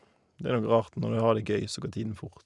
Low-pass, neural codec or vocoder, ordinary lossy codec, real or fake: 10.8 kHz; none; none; real